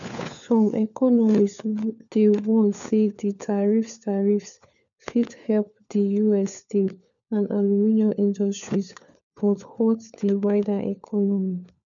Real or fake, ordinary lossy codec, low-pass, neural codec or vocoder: fake; none; 7.2 kHz; codec, 16 kHz, 4 kbps, FunCodec, trained on LibriTTS, 50 frames a second